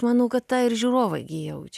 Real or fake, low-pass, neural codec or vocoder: real; 14.4 kHz; none